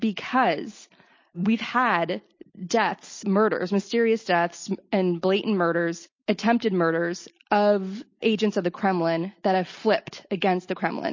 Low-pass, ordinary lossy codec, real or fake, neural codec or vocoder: 7.2 kHz; MP3, 32 kbps; real; none